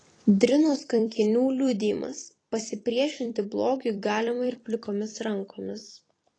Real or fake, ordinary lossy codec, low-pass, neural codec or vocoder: fake; AAC, 32 kbps; 9.9 kHz; vocoder, 44.1 kHz, 128 mel bands every 256 samples, BigVGAN v2